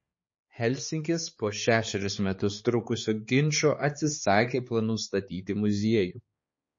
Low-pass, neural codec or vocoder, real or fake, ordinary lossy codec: 7.2 kHz; codec, 16 kHz, 4 kbps, X-Codec, HuBERT features, trained on balanced general audio; fake; MP3, 32 kbps